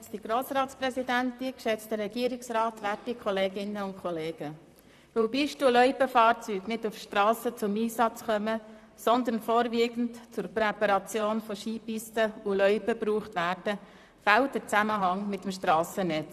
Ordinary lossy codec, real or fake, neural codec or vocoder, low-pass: none; fake; vocoder, 44.1 kHz, 128 mel bands, Pupu-Vocoder; 14.4 kHz